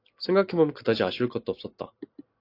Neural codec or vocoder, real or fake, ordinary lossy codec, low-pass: none; real; AAC, 32 kbps; 5.4 kHz